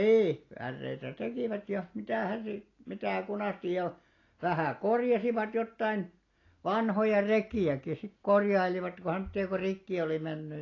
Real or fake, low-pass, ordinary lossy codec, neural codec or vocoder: real; 7.2 kHz; AAC, 32 kbps; none